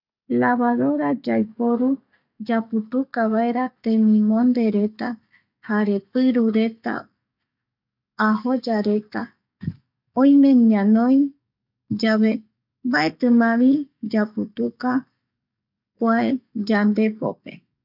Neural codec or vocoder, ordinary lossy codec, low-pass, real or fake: codec, 44.1 kHz, 7.8 kbps, DAC; none; 5.4 kHz; fake